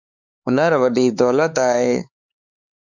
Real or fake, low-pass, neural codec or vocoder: fake; 7.2 kHz; codec, 16 kHz, 4 kbps, X-Codec, HuBERT features, trained on LibriSpeech